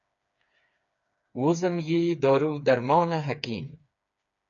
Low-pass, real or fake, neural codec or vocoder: 7.2 kHz; fake; codec, 16 kHz, 4 kbps, FreqCodec, smaller model